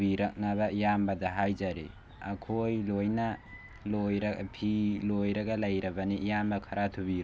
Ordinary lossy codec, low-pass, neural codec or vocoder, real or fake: none; none; none; real